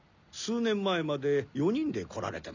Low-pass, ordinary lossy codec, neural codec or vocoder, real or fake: 7.2 kHz; none; none; real